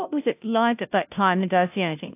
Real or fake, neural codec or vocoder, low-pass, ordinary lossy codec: fake; codec, 16 kHz, 0.5 kbps, FunCodec, trained on Chinese and English, 25 frames a second; 3.6 kHz; AAC, 32 kbps